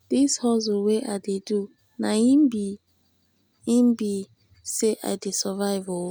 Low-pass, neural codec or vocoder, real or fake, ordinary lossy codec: none; none; real; none